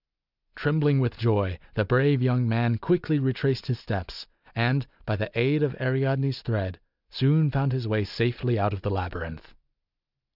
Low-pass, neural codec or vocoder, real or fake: 5.4 kHz; none; real